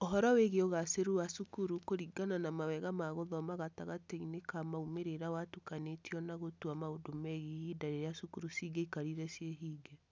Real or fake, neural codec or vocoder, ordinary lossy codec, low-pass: real; none; none; none